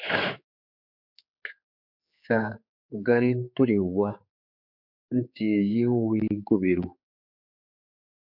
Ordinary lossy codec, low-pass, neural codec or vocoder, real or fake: MP3, 48 kbps; 5.4 kHz; codec, 16 kHz, 4 kbps, X-Codec, HuBERT features, trained on general audio; fake